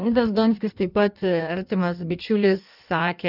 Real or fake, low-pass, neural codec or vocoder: fake; 5.4 kHz; codec, 16 kHz in and 24 kHz out, 1.1 kbps, FireRedTTS-2 codec